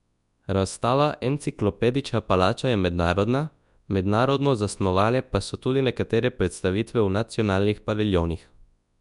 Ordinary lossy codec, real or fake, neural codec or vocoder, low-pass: none; fake; codec, 24 kHz, 0.9 kbps, WavTokenizer, large speech release; 10.8 kHz